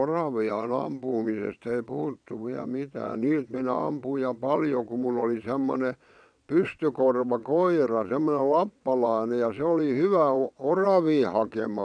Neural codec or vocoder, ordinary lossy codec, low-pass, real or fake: vocoder, 22.05 kHz, 80 mel bands, WaveNeXt; none; 9.9 kHz; fake